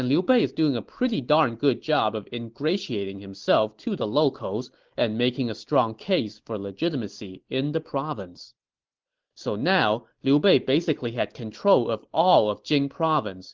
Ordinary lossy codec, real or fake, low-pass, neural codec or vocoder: Opus, 16 kbps; real; 7.2 kHz; none